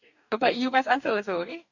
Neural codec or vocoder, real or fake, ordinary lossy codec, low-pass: codec, 44.1 kHz, 2.6 kbps, DAC; fake; none; 7.2 kHz